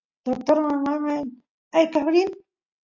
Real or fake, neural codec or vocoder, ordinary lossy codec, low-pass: real; none; AAC, 48 kbps; 7.2 kHz